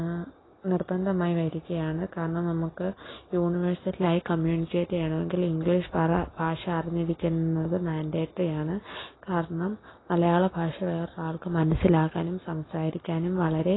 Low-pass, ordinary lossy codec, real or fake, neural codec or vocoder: 7.2 kHz; AAC, 16 kbps; real; none